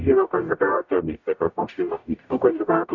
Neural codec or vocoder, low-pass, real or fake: codec, 44.1 kHz, 0.9 kbps, DAC; 7.2 kHz; fake